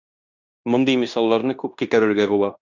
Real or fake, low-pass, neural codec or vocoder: fake; 7.2 kHz; codec, 16 kHz in and 24 kHz out, 0.9 kbps, LongCat-Audio-Codec, fine tuned four codebook decoder